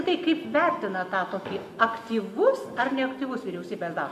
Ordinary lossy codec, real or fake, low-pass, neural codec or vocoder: AAC, 96 kbps; real; 14.4 kHz; none